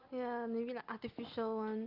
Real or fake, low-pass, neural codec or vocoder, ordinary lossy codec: real; 5.4 kHz; none; Opus, 24 kbps